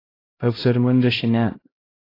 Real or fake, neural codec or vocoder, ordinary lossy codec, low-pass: fake; codec, 16 kHz, 0.5 kbps, X-Codec, HuBERT features, trained on LibriSpeech; AAC, 24 kbps; 5.4 kHz